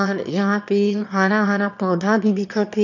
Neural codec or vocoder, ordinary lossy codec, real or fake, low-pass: autoencoder, 22.05 kHz, a latent of 192 numbers a frame, VITS, trained on one speaker; none; fake; 7.2 kHz